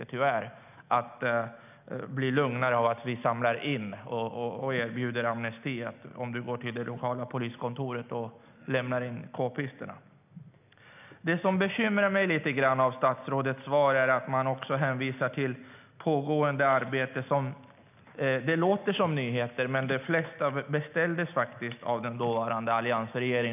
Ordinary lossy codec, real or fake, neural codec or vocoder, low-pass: none; real; none; 3.6 kHz